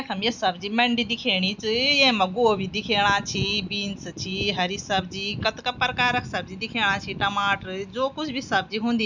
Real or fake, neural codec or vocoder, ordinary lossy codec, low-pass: real; none; none; 7.2 kHz